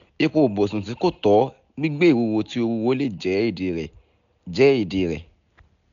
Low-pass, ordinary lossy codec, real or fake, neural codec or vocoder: 7.2 kHz; none; real; none